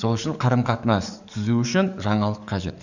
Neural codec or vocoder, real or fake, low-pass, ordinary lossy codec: codec, 44.1 kHz, 7.8 kbps, DAC; fake; 7.2 kHz; none